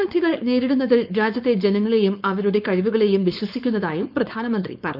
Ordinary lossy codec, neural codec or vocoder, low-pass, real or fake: none; codec, 16 kHz, 4.8 kbps, FACodec; 5.4 kHz; fake